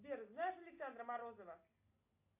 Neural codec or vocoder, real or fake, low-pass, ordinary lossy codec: none; real; 3.6 kHz; MP3, 24 kbps